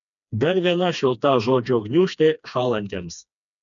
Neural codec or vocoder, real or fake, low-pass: codec, 16 kHz, 2 kbps, FreqCodec, smaller model; fake; 7.2 kHz